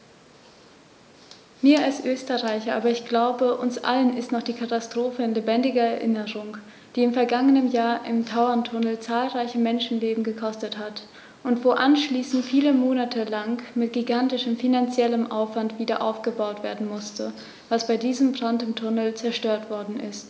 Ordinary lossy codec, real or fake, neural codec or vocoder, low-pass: none; real; none; none